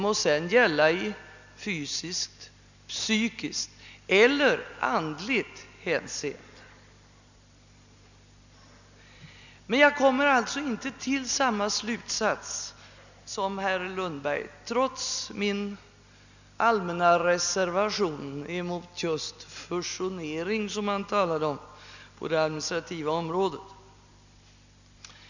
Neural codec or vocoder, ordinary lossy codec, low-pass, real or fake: none; none; 7.2 kHz; real